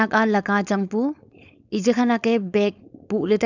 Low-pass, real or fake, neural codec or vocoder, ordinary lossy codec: 7.2 kHz; fake; codec, 16 kHz, 4.8 kbps, FACodec; none